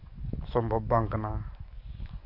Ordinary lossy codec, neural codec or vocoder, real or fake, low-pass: MP3, 48 kbps; codec, 44.1 kHz, 7.8 kbps, DAC; fake; 5.4 kHz